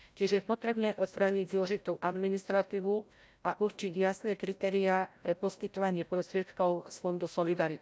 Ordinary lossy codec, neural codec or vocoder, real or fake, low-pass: none; codec, 16 kHz, 0.5 kbps, FreqCodec, larger model; fake; none